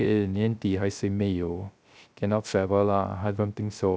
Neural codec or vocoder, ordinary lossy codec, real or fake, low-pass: codec, 16 kHz, 0.3 kbps, FocalCodec; none; fake; none